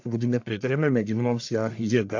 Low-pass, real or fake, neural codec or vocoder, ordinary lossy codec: 7.2 kHz; fake; codec, 44.1 kHz, 1.7 kbps, Pupu-Codec; AAC, 48 kbps